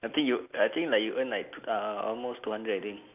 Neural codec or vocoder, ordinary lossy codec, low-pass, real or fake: none; AAC, 32 kbps; 3.6 kHz; real